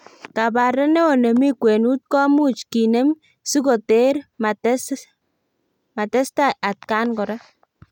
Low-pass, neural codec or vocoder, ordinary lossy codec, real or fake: 19.8 kHz; none; none; real